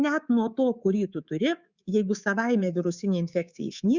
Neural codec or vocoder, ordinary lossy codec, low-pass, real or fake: codec, 24 kHz, 3.1 kbps, DualCodec; Opus, 64 kbps; 7.2 kHz; fake